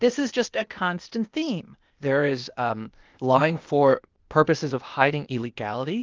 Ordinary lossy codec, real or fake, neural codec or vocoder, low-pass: Opus, 24 kbps; fake; codec, 16 kHz, 0.8 kbps, ZipCodec; 7.2 kHz